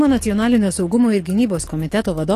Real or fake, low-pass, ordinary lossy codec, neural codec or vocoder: fake; 14.4 kHz; AAC, 48 kbps; codec, 44.1 kHz, 7.8 kbps, DAC